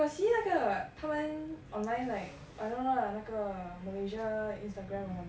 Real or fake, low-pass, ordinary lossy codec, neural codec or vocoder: real; none; none; none